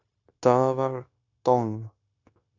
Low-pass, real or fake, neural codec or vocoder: 7.2 kHz; fake; codec, 16 kHz, 0.9 kbps, LongCat-Audio-Codec